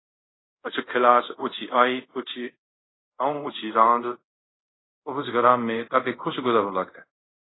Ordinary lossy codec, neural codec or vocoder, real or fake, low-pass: AAC, 16 kbps; codec, 24 kHz, 0.5 kbps, DualCodec; fake; 7.2 kHz